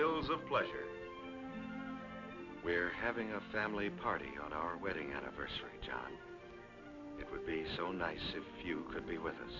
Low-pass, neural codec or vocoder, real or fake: 7.2 kHz; none; real